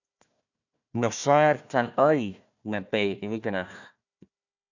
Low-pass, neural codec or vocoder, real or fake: 7.2 kHz; codec, 16 kHz, 1 kbps, FunCodec, trained on Chinese and English, 50 frames a second; fake